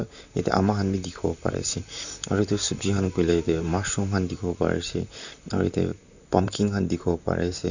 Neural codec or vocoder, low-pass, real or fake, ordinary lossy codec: vocoder, 44.1 kHz, 128 mel bands, Pupu-Vocoder; 7.2 kHz; fake; none